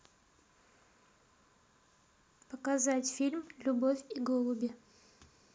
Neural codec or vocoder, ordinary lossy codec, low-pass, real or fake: none; none; none; real